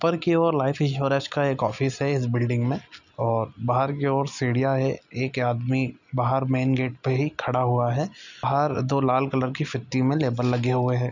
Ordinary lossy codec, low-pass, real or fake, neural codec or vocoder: none; 7.2 kHz; real; none